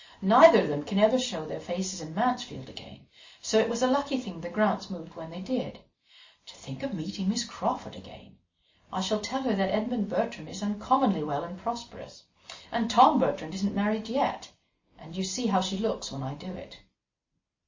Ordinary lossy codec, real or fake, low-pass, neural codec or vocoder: MP3, 32 kbps; real; 7.2 kHz; none